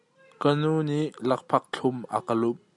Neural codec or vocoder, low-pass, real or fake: none; 10.8 kHz; real